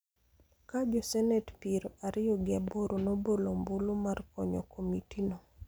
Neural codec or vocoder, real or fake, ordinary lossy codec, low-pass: none; real; none; none